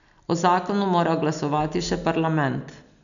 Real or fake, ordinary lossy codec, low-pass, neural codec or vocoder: real; none; 7.2 kHz; none